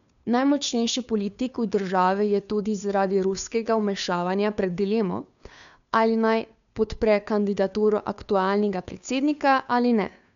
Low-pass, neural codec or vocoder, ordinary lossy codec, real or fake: 7.2 kHz; codec, 16 kHz, 2 kbps, FunCodec, trained on Chinese and English, 25 frames a second; none; fake